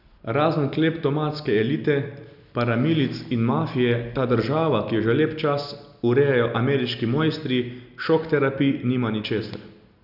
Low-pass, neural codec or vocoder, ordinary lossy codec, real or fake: 5.4 kHz; none; none; real